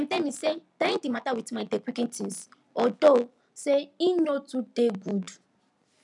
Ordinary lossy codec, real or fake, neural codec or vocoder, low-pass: none; real; none; 10.8 kHz